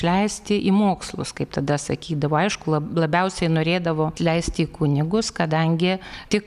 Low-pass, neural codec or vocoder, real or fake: 14.4 kHz; none; real